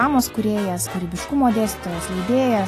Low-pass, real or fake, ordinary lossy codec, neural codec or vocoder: 14.4 kHz; real; AAC, 48 kbps; none